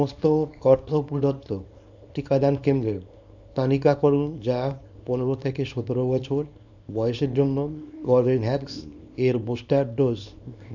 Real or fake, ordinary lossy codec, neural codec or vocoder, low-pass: fake; none; codec, 24 kHz, 0.9 kbps, WavTokenizer, small release; 7.2 kHz